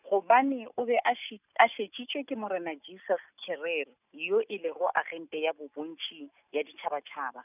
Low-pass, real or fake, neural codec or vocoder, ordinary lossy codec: 3.6 kHz; real; none; none